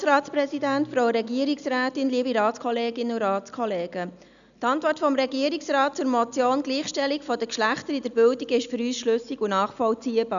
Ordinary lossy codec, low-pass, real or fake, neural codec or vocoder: none; 7.2 kHz; real; none